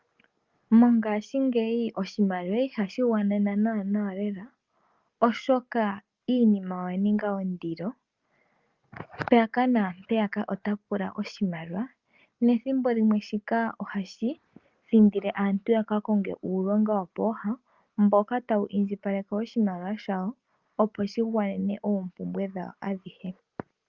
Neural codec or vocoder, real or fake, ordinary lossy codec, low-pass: none; real; Opus, 24 kbps; 7.2 kHz